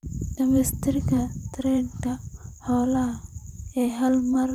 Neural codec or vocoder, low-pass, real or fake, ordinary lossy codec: vocoder, 44.1 kHz, 128 mel bands every 512 samples, BigVGAN v2; 19.8 kHz; fake; none